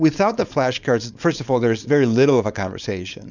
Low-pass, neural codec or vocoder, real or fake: 7.2 kHz; codec, 16 kHz, 4.8 kbps, FACodec; fake